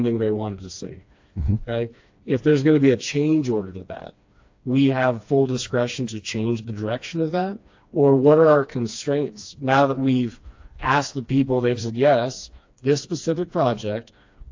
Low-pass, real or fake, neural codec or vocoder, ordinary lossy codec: 7.2 kHz; fake; codec, 16 kHz, 2 kbps, FreqCodec, smaller model; AAC, 48 kbps